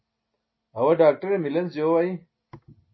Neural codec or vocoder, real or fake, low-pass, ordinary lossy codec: none; real; 7.2 kHz; MP3, 24 kbps